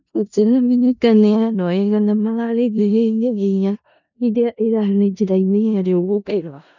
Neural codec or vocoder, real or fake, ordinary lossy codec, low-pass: codec, 16 kHz in and 24 kHz out, 0.4 kbps, LongCat-Audio-Codec, four codebook decoder; fake; none; 7.2 kHz